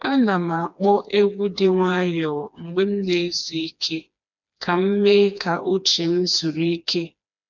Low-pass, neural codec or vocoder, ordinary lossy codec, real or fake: 7.2 kHz; codec, 16 kHz, 2 kbps, FreqCodec, smaller model; none; fake